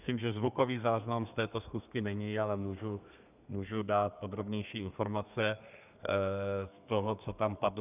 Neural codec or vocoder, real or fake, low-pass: codec, 32 kHz, 1.9 kbps, SNAC; fake; 3.6 kHz